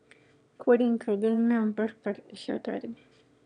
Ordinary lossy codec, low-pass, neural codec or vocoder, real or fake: none; 9.9 kHz; autoencoder, 22.05 kHz, a latent of 192 numbers a frame, VITS, trained on one speaker; fake